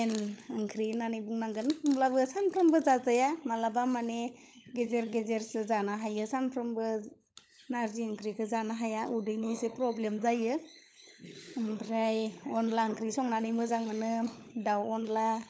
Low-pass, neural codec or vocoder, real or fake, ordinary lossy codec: none; codec, 16 kHz, 16 kbps, FunCodec, trained on LibriTTS, 50 frames a second; fake; none